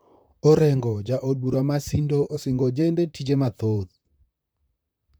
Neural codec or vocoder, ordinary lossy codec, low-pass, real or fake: vocoder, 44.1 kHz, 128 mel bands, Pupu-Vocoder; none; none; fake